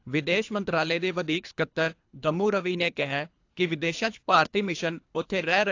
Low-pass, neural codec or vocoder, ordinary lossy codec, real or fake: 7.2 kHz; codec, 24 kHz, 3 kbps, HILCodec; AAC, 48 kbps; fake